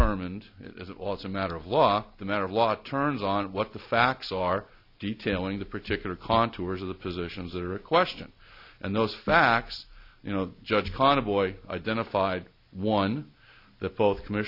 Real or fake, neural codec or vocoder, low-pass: real; none; 5.4 kHz